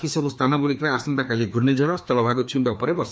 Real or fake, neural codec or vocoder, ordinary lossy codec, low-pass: fake; codec, 16 kHz, 2 kbps, FreqCodec, larger model; none; none